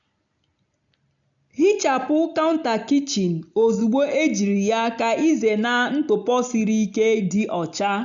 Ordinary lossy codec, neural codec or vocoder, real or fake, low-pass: none; none; real; 7.2 kHz